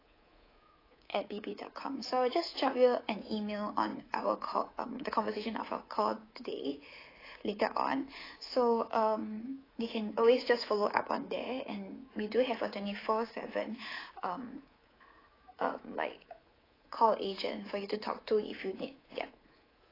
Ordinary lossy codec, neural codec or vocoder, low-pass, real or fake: AAC, 24 kbps; codec, 24 kHz, 3.1 kbps, DualCodec; 5.4 kHz; fake